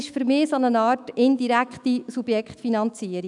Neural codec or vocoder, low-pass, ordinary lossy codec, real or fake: autoencoder, 48 kHz, 128 numbers a frame, DAC-VAE, trained on Japanese speech; 10.8 kHz; none; fake